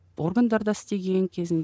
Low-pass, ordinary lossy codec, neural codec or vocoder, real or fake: none; none; none; real